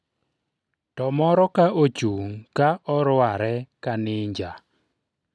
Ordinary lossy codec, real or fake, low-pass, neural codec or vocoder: none; real; none; none